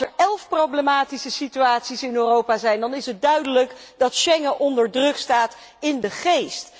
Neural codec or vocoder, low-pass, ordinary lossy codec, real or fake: none; none; none; real